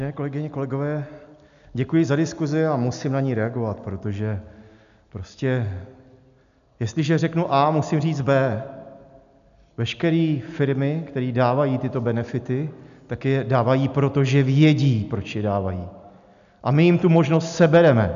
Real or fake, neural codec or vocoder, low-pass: real; none; 7.2 kHz